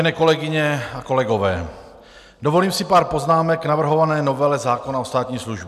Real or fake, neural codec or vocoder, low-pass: real; none; 14.4 kHz